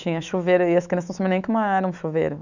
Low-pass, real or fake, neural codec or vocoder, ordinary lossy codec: 7.2 kHz; fake; autoencoder, 48 kHz, 128 numbers a frame, DAC-VAE, trained on Japanese speech; none